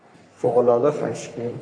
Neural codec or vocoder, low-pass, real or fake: codec, 44.1 kHz, 3.4 kbps, Pupu-Codec; 9.9 kHz; fake